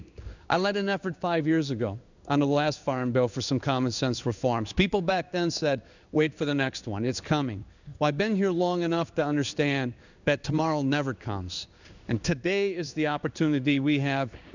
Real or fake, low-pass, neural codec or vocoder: fake; 7.2 kHz; codec, 16 kHz in and 24 kHz out, 1 kbps, XY-Tokenizer